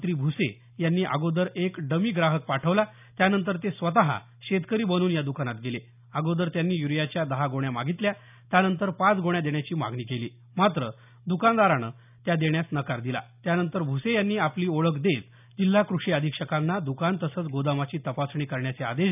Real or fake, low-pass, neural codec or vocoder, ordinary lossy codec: real; 3.6 kHz; none; none